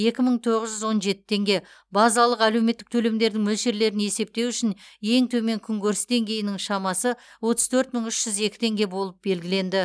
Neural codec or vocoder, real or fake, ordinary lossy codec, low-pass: none; real; none; none